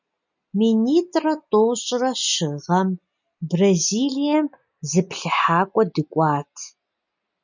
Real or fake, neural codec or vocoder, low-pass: real; none; 7.2 kHz